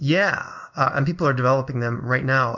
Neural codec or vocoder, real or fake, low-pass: codec, 16 kHz in and 24 kHz out, 1 kbps, XY-Tokenizer; fake; 7.2 kHz